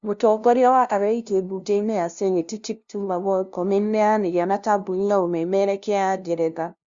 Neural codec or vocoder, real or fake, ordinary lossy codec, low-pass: codec, 16 kHz, 0.5 kbps, FunCodec, trained on LibriTTS, 25 frames a second; fake; Opus, 64 kbps; 7.2 kHz